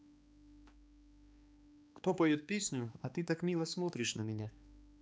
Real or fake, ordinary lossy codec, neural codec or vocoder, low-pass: fake; none; codec, 16 kHz, 2 kbps, X-Codec, HuBERT features, trained on balanced general audio; none